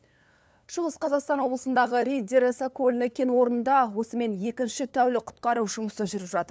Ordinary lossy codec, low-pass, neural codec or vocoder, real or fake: none; none; codec, 16 kHz, 2 kbps, FunCodec, trained on LibriTTS, 25 frames a second; fake